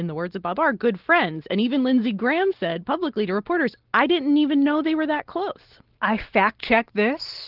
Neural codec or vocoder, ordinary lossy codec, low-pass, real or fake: none; Opus, 16 kbps; 5.4 kHz; real